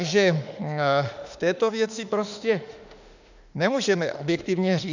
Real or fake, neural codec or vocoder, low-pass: fake; autoencoder, 48 kHz, 32 numbers a frame, DAC-VAE, trained on Japanese speech; 7.2 kHz